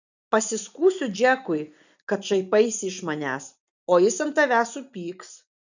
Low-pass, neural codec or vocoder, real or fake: 7.2 kHz; none; real